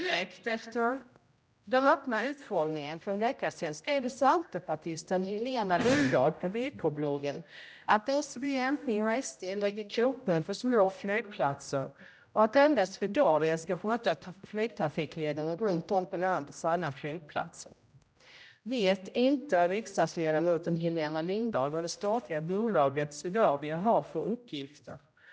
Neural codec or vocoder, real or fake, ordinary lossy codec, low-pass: codec, 16 kHz, 0.5 kbps, X-Codec, HuBERT features, trained on general audio; fake; none; none